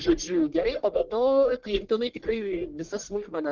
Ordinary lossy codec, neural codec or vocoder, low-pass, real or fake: Opus, 16 kbps; codec, 44.1 kHz, 1.7 kbps, Pupu-Codec; 7.2 kHz; fake